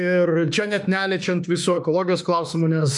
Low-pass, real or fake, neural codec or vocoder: 10.8 kHz; fake; autoencoder, 48 kHz, 32 numbers a frame, DAC-VAE, trained on Japanese speech